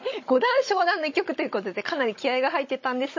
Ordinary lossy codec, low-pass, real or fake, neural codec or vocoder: MP3, 32 kbps; 7.2 kHz; fake; codec, 16 kHz, 16 kbps, FunCodec, trained on Chinese and English, 50 frames a second